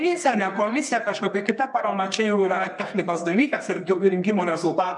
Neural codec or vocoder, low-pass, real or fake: codec, 24 kHz, 0.9 kbps, WavTokenizer, medium music audio release; 10.8 kHz; fake